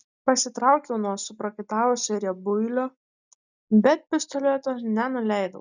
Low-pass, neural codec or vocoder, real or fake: 7.2 kHz; none; real